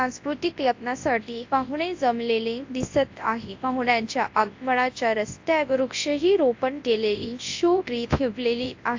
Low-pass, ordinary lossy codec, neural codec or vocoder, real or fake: 7.2 kHz; AAC, 48 kbps; codec, 24 kHz, 0.9 kbps, WavTokenizer, large speech release; fake